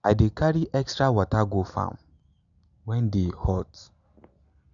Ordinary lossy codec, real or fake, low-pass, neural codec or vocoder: none; real; 7.2 kHz; none